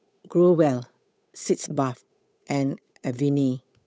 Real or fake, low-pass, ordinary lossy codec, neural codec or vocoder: fake; none; none; codec, 16 kHz, 8 kbps, FunCodec, trained on Chinese and English, 25 frames a second